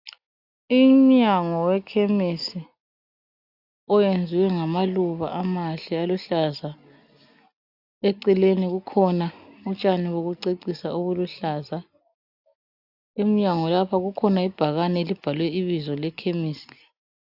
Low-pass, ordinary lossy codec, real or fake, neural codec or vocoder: 5.4 kHz; AAC, 48 kbps; real; none